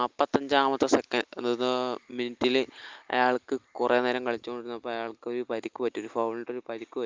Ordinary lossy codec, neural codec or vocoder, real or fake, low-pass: Opus, 32 kbps; none; real; 7.2 kHz